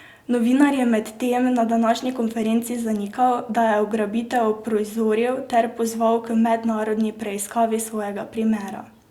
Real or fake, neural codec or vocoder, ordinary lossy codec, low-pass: real; none; Opus, 64 kbps; 19.8 kHz